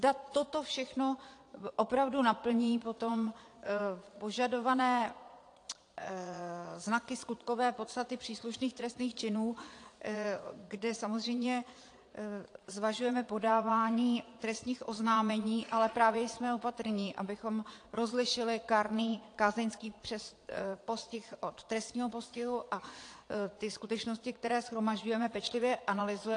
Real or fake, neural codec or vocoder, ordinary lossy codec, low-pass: fake; vocoder, 22.05 kHz, 80 mel bands, Vocos; AAC, 48 kbps; 9.9 kHz